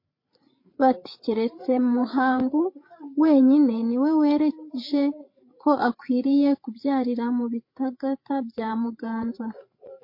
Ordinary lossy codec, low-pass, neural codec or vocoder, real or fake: MP3, 32 kbps; 5.4 kHz; codec, 16 kHz, 8 kbps, FreqCodec, larger model; fake